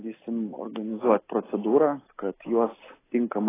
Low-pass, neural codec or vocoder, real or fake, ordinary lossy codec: 3.6 kHz; none; real; AAC, 16 kbps